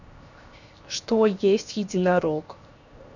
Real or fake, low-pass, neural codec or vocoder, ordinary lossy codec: fake; 7.2 kHz; codec, 16 kHz, 0.7 kbps, FocalCodec; none